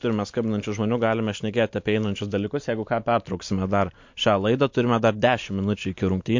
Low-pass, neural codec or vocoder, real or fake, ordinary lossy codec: 7.2 kHz; none; real; MP3, 48 kbps